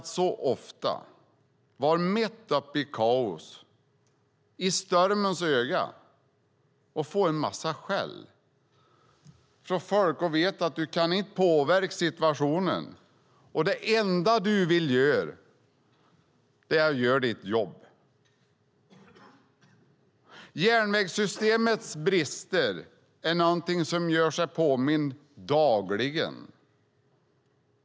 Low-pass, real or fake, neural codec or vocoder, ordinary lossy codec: none; real; none; none